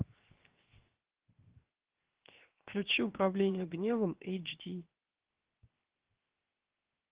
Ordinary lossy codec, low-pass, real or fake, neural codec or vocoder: Opus, 16 kbps; 3.6 kHz; fake; codec, 16 kHz, 0.7 kbps, FocalCodec